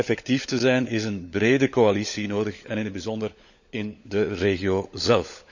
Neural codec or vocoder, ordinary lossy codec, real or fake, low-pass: codec, 16 kHz, 16 kbps, FunCodec, trained on LibriTTS, 50 frames a second; none; fake; 7.2 kHz